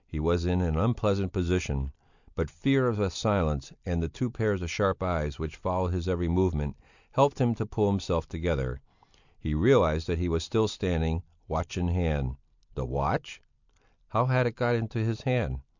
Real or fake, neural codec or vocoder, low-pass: real; none; 7.2 kHz